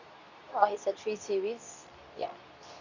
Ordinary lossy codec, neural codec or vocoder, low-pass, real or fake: none; codec, 24 kHz, 0.9 kbps, WavTokenizer, medium speech release version 2; 7.2 kHz; fake